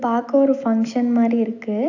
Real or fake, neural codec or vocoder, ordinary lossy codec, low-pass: real; none; AAC, 48 kbps; 7.2 kHz